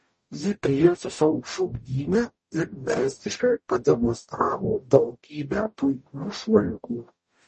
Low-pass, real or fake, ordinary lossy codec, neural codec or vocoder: 10.8 kHz; fake; MP3, 32 kbps; codec, 44.1 kHz, 0.9 kbps, DAC